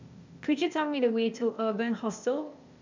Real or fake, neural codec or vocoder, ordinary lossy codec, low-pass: fake; codec, 16 kHz, 0.8 kbps, ZipCodec; MP3, 64 kbps; 7.2 kHz